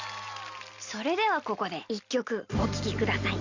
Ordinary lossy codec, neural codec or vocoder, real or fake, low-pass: Opus, 64 kbps; none; real; 7.2 kHz